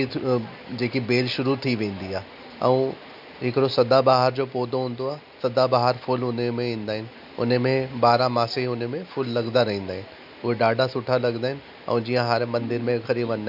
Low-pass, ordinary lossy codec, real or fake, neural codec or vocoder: 5.4 kHz; none; real; none